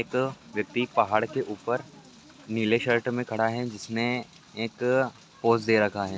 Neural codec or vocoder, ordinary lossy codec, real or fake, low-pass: none; none; real; none